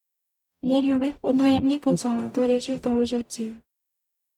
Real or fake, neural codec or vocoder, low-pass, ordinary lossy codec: fake; codec, 44.1 kHz, 0.9 kbps, DAC; 19.8 kHz; none